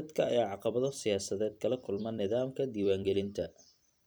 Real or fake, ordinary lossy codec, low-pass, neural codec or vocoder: fake; none; none; vocoder, 44.1 kHz, 128 mel bands every 256 samples, BigVGAN v2